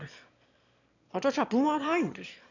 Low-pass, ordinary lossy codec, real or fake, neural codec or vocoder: 7.2 kHz; none; fake; autoencoder, 22.05 kHz, a latent of 192 numbers a frame, VITS, trained on one speaker